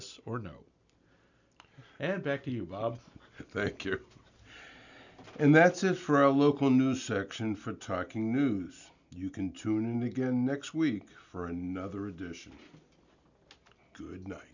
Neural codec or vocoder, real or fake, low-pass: none; real; 7.2 kHz